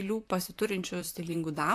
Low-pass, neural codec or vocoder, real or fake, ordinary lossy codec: 14.4 kHz; vocoder, 44.1 kHz, 128 mel bands, Pupu-Vocoder; fake; AAC, 64 kbps